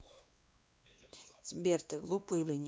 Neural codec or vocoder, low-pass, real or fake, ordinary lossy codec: codec, 16 kHz, 2 kbps, X-Codec, WavLM features, trained on Multilingual LibriSpeech; none; fake; none